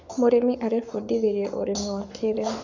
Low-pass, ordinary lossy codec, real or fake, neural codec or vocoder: 7.2 kHz; AAC, 48 kbps; fake; codec, 44.1 kHz, 7.8 kbps, Pupu-Codec